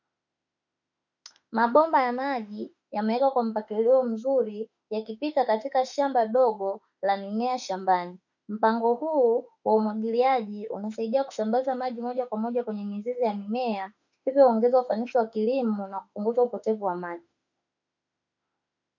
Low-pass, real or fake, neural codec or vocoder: 7.2 kHz; fake; autoencoder, 48 kHz, 32 numbers a frame, DAC-VAE, trained on Japanese speech